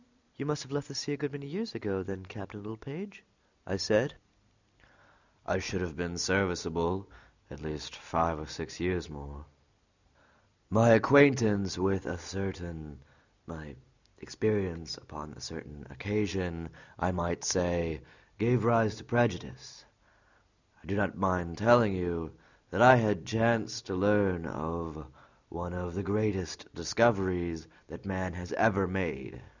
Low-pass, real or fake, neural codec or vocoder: 7.2 kHz; real; none